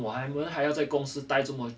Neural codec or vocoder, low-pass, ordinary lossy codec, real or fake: none; none; none; real